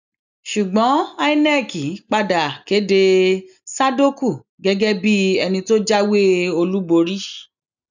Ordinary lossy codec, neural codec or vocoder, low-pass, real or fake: none; none; 7.2 kHz; real